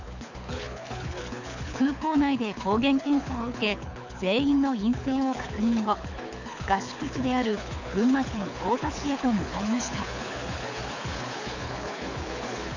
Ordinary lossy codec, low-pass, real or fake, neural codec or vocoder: none; 7.2 kHz; fake; codec, 24 kHz, 6 kbps, HILCodec